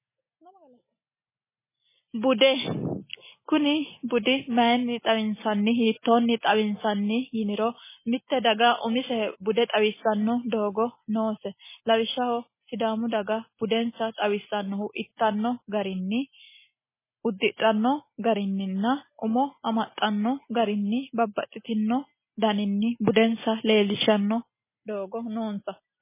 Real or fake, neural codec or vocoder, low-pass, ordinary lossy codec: real; none; 3.6 kHz; MP3, 16 kbps